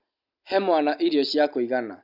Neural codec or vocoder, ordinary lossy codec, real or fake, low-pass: none; none; real; 5.4 kHz